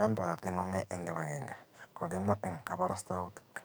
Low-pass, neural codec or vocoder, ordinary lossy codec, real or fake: none; codec, 44.1 kHz, 2.6 kbps, SNAC; none; fake